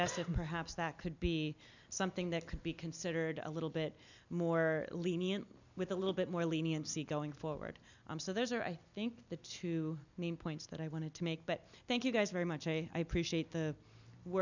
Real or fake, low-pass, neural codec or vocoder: real; 7.2 kHz; none